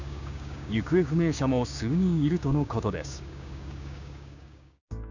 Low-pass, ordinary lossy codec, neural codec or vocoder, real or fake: 7.2 kHz; none; codec, 16 kHz, 6 kbps, DAC; fake